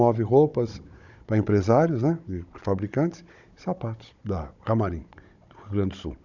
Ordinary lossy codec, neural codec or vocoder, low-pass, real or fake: none; codec, 16 kHz, 16 kbps, FunCodec, trained on Chinese and English, 50 frames a second; 7.2 kHz; fake